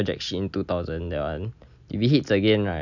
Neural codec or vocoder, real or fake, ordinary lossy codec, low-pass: none; real; none; 7.2 kHz